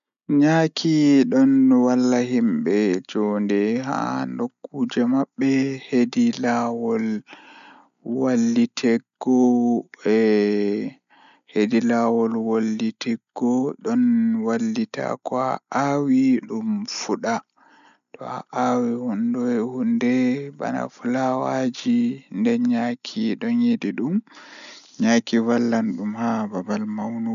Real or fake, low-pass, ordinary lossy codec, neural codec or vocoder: real; 7.2 kHz; none; none